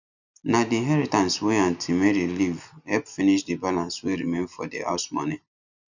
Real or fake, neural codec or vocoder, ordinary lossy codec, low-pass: real; none; none; 7.2 kHz